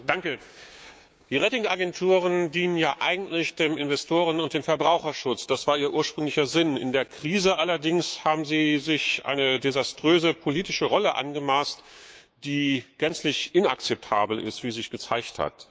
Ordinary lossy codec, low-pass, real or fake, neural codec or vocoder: none; none; fake; codec, 16 kHz, 6 kbps, DAC